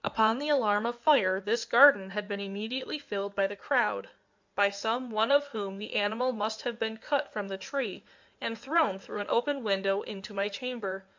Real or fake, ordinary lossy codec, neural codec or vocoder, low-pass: fake; MP3, 64 kbps; codec, 16 kHz in and 24 kHz out, 2.2 kbps, FireRedTTS-2 codec; 7.2 kHz